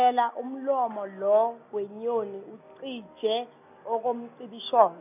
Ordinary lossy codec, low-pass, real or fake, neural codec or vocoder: none; 3.6 kHz; real; none